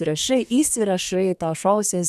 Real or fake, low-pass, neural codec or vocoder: fake; 14.4 kHz; codec, 32 kHz, 1.9 kbps, SNAC